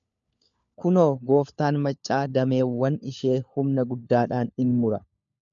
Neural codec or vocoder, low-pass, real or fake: codec, 16 kHz, 4 kbps, FunCodec, trained on LibriTTS, 50 frames a second; 7.2 kHz; fake